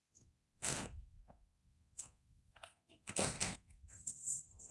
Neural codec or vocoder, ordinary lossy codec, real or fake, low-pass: codec, 24 kHz, 0.9 kbps, DualCodec; none; fake; none